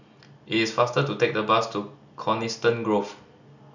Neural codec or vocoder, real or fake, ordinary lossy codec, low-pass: none; real; none; 7.2 kHz